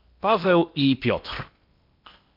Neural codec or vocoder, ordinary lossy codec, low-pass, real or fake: codec, 16 kHz in and 24 kHz out, 0.8 kbps, FocalCodec, streaming, 65536 codes; MP3, 48 kbps; 5.4 kHz; fake